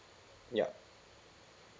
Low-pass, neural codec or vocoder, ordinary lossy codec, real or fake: none; none; none; real